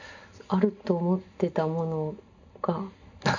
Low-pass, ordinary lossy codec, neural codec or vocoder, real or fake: 7.2 kHz; none; none; real